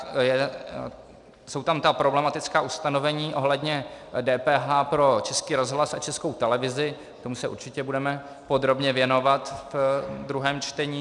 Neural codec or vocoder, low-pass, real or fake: none; 10.8 kHz; real